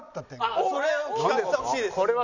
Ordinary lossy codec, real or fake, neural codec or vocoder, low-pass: none; real; none; 7.2 kHz